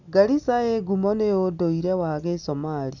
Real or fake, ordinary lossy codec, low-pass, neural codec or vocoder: real; none; 7.2 kHz; none